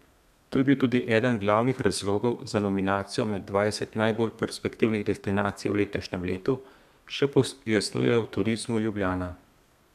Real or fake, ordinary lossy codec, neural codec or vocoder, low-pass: fake; none; codec, 32 kHz, 1.9 kbps, SNAC; 14.4 kHz